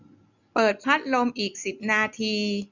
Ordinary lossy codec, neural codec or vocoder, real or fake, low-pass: none; none; real; 7.2 kHz